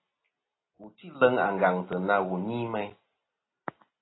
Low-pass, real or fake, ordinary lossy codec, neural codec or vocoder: 7.2 kHz; real; AAC, 16 kbps; none